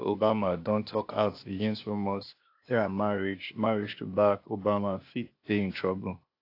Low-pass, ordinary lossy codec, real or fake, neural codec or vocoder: 5.4 kHz; AAC, 32 kbps; fake; codec, 16 kHz, 0.8 kbps, ZipCodec